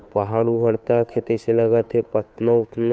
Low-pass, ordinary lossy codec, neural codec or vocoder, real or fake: none; none; codec, 16 kHz, 2 kbps, FunCodec, trained on Chinese and English, 25 frames a second; fake